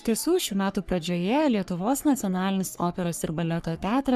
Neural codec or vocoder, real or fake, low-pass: codec, 44.1 kHz, 3.4 kbps, Pupu-Codec; fake; 14.4 kHz